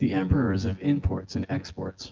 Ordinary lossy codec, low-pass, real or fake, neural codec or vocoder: Opus, 32 kbps; 7.2 kHz; fake; vocoder, 24 kHz, 100 mel bands, Vocos